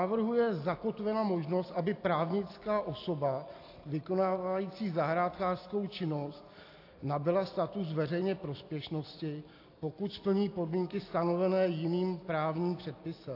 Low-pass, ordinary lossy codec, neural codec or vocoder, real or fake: 5.4 kHz; AAC, 32 kbps; none; real